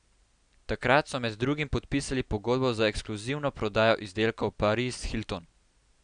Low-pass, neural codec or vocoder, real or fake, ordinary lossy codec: 9.9 kHz; none; real; none